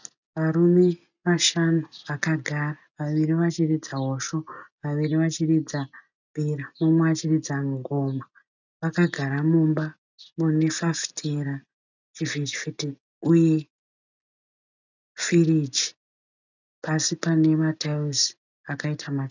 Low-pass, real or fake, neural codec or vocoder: 7.2 kHz; real; none